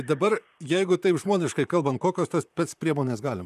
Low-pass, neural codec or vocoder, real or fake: 14.4 kHz; vocoder, 44.1 kHz, 128 mel bands, Pupu-Vocoder; fake